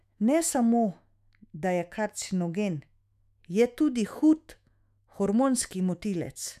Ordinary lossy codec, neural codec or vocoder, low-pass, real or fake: none; autoencoder, 48 kHz, 128 numbers a frame, DAC-VAE, trained on Japanese speech; 14.4 kHz; fake